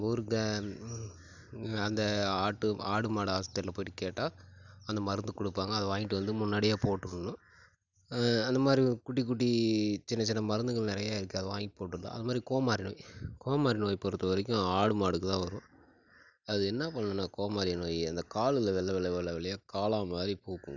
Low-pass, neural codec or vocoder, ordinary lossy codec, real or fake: 7.2 kHz; none; none; real